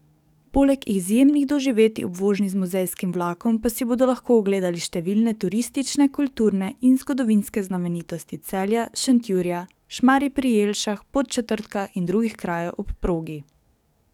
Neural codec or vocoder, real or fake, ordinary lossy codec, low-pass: codec, 44.1 kHz, 7.8 kbps, DAC; fake; none; 19.8 kHz